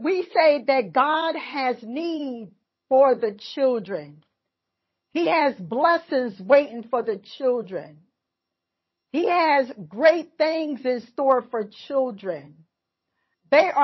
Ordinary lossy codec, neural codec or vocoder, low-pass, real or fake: MP3, 24 kbps; vocoder, 22.05 kHz, 80 mel bands, HiFi-GAN; 7.2 kHz; fake